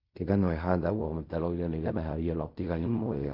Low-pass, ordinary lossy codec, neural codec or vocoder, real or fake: 5.4 kHz; MP3, 32 kbps; codec, 16 kHz in and 24 kHz out, 0.4 kbps, LongCat-Audio-Codec, fine tuned four codebook decoder; fake